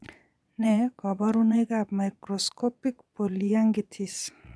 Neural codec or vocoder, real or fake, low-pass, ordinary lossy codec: vocoder, 22.05 kHz, 80 mel bands, Vocos; fake; none; none